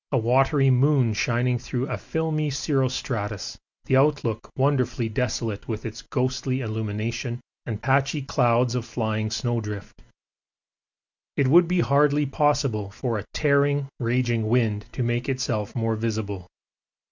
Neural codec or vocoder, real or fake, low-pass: none; real; 7.2 kHz